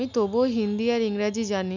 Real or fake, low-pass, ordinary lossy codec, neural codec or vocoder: real; 7.2 kHz; none; none